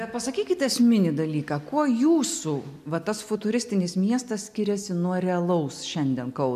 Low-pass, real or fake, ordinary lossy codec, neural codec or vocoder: 14.4 kHz; real; MP3, 96 kbps; none